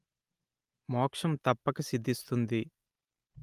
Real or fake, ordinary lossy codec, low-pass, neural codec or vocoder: real; Opus, 32 kbps; 14.4 kHz; none